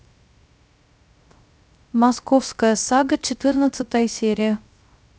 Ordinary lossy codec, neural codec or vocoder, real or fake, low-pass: none; codec, 16 kHz, 0.3 kbps, FocalCodec; fake; none